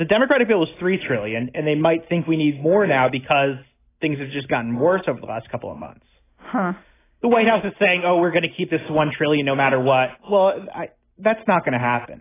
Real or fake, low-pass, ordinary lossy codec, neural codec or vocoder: real; 3.6 kHz; AAC, 16 kbps; none